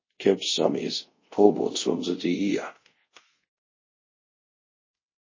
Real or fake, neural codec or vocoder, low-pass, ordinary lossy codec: fake; codec, 24 kHz, 0.5 kbps, DualCodec; 7.2 kHz; MP3, 32 kbps